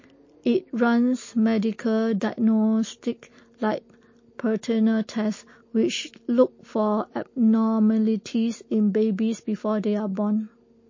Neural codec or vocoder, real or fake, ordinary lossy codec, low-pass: none; real; MP3, 32 kbps; 7.2 kHz